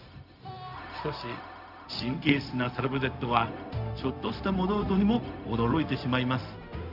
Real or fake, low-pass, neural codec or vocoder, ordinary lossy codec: fake; 5.4 kHz; codec, 16 kHz, 0.4 kbps, LongCat-Audio-Codec; none